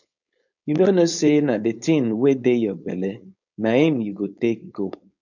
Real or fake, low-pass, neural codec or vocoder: fake; 7.2 kHz; codec, 16 kHz, 4.8 kbps, FACodec